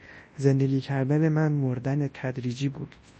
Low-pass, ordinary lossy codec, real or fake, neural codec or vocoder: 10.8 kHz; MP3, 32 kbps; fake; codec, 24 kHz, 0.9 kbps, WavTokenizer, large speech release